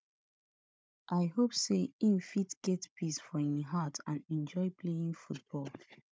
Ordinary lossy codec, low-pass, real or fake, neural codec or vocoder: none; none; fake; codec, 16 kHz, 16 kbps, FunCodec, trained on Chinese and English, 50 frames a second